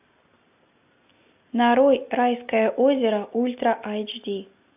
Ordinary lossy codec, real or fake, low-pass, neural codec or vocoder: AAC, 32 kbps; real; 3.6 kHz; none